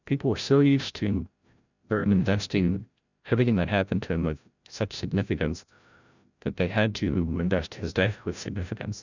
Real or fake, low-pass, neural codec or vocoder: fake; 7.2 kHz; codec, 16 kHz, 0.5 kbps, FreqCodec, larger model